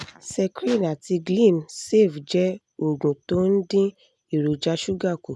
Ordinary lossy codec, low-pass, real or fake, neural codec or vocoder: none; none; real; none